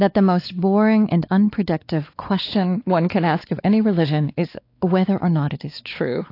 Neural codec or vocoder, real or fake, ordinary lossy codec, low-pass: codec, 16 kHz, 4 kbps, X-Codec, WavLM features, trained on Multilingual LibriSpeech; fake; AAC, 32 kbps; 5.4 kHz